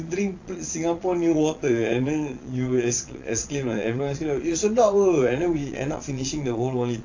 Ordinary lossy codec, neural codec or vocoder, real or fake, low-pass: AAC, 48 kbps; vocoder, 22.05 kHz, 80 mel bands, Vocos; fake; 7.2 kHz